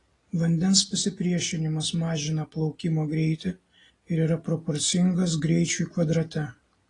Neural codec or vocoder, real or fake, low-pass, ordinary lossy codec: none; real; 10.8 kHz; AAC, 32 kbps